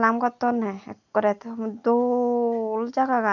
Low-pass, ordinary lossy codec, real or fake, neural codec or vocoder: 7.2 kHz; none; real; none